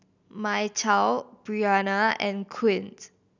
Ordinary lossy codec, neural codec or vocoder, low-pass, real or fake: none; none; 7.2 kHz; real